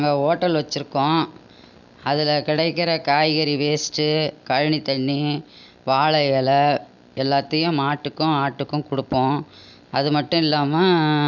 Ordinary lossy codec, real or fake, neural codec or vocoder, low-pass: none; real; none; 7.2 kHz